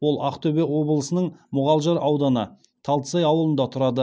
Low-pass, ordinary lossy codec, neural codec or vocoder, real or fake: none; none; none; real